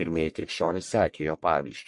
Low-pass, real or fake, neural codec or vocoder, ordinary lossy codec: 10.8 kHz; fake; codec, 44.1 kHz, 1.7 kbps, Pupu-Codec; MP3, 48 kbps